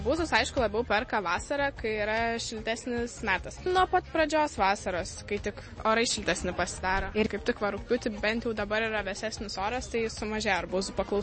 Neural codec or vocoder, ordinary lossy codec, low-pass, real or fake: none; MP3, 32 kbps; 9.9 kHz; real